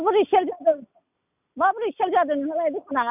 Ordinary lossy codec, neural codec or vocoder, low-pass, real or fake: none; none; 3.6 kHz; real